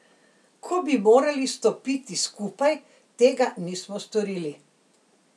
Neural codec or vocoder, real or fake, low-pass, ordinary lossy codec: none; real; none; none